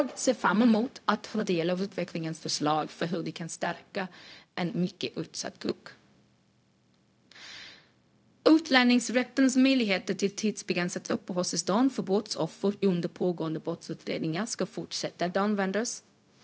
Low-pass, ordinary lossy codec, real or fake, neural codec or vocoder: none; none; fake; codec, 16 kHz, 0.4 kbps, LongCat-Audio-Codec